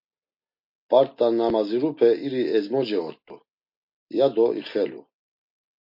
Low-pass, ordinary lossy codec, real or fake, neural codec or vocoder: 5.4 kHz; MP3, 32 kbps; real; none